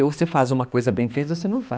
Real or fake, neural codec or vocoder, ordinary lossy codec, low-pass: fake; codec, 16 kHz, 2 kbps, X-Codec, HuBERT features, trained on balanced general audio; none; none